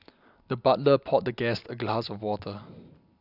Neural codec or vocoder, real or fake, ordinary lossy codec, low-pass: none; real; none; 5.4 kHz